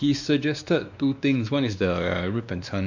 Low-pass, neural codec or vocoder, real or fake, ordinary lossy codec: 7.2 kHz; codec, 16 kHz, 2 kbps, X-Codec, WavLM features, trained on Multilingual LibriSpeech; fake; none